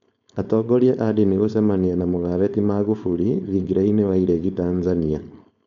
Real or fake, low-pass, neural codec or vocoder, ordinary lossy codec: fake; 7.2 kHz; codec, 16 kHz, 4.8 kbps, FACodec; none